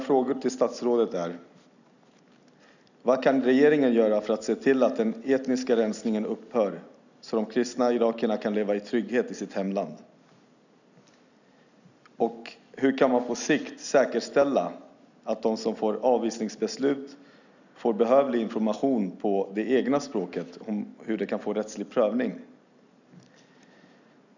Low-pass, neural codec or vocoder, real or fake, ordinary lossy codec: 7.2 kHz; none; real; none